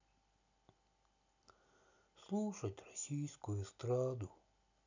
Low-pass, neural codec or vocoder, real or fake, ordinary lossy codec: 7.2 kHz; none; real; none